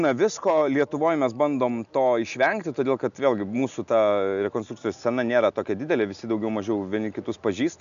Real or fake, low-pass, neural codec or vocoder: real; 7.2 kHz; none